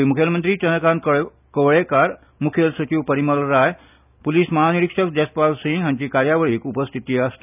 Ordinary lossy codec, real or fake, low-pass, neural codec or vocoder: none; real; 3.6 kHz; none